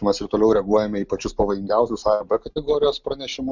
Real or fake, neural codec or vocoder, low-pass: real; none; 7.2 kHz